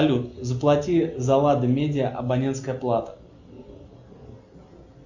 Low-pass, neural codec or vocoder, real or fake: 7.2 kHz; none; real